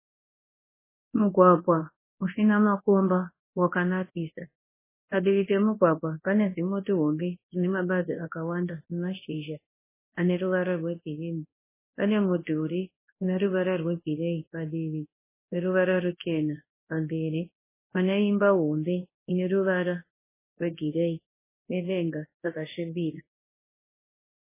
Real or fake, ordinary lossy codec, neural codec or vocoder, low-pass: fake; MP3, 16 kbps; codec, 24 kHz, 0.9 kbps, WavTokenizer, large speech release; 3.6 kHz